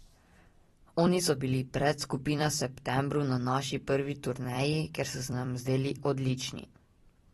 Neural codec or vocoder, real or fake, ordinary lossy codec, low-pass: vocoder, 44.1 kHz, 128 mel bands every 256 samples, BigVGAN v2; fake; AAC, 32 kbps; 19.8 kHz